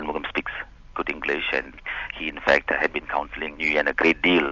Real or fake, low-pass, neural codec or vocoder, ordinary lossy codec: real; 7.2 kHz; none; AAC, 48 kbps